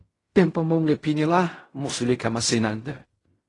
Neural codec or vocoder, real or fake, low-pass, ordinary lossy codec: codec, 16 kHz in and 24 kHz out, 0.4 kbps, LongCat-Audio-Codec, fine tuned four codebook decoder; fake; 10.8 kHz; AAC, 32 kbps